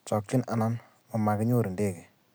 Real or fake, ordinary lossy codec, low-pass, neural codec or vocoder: real; none; none; none